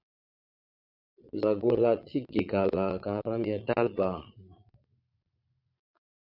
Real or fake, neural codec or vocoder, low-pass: fake; vocoder, 22.05 kHz, 80 mel bands, Vocos; 5.4 kHz